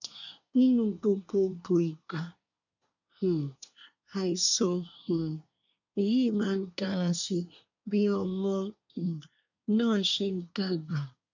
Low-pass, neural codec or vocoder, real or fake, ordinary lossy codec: 7.2 kHz; codec, 24 kHz, 1 kbps, SNAC; fake; none